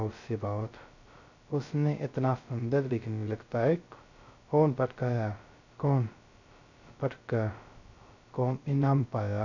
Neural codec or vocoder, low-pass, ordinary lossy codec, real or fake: codec, 16 kHz, 0.2 kbps, FocalCodec; 7.2 kHz; none; fake